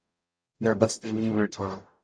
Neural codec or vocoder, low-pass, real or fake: codec, 44.1 kHz, 0.9 kbps, DAC; 9.9 kHz; fake